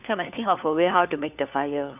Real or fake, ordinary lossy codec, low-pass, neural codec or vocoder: fake; none; 3.6 kHz; codec, 16 kHz, 4 kbps, FunCodec, trained on LibriTTS, 50 frames a second